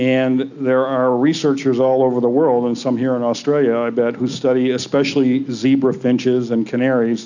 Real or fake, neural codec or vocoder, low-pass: real; none; 7.2 kHz